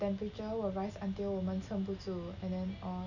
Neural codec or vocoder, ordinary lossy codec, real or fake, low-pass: none; none; real; 7.2 kHz